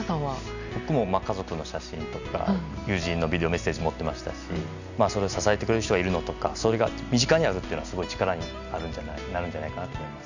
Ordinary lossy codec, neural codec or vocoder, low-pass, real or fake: none; none; 7.2 kHz; real